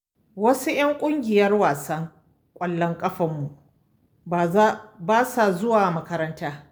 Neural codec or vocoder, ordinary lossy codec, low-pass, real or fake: none; none; none; real